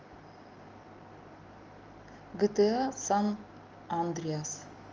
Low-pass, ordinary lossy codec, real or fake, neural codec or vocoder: 7.2 kHz; Opus, 32 kbps; real; none